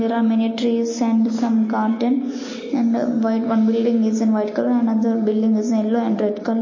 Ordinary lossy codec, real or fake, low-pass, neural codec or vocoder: MP3, 32 kbps; real; 7.2 kHz; none